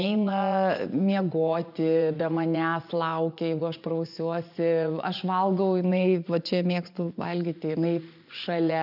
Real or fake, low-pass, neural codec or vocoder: fake; 5.4 kHz; vocoder, 24 kHz, 100 mel bands, Vocos